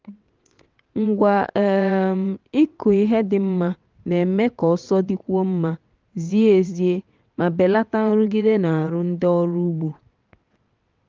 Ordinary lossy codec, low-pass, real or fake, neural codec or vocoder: Opus, 32 kbps; 7.2 kHz; fake; vocoder, 22.05 kHz, 80 mel bands, WaveNeXt